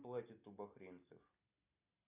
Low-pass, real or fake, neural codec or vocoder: 3.6 kHz; real; none